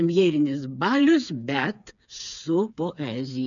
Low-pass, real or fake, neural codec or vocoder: 7.2 kHz; fake; codec, 16 kHz, 4 kbps, FreqCodec, smaller model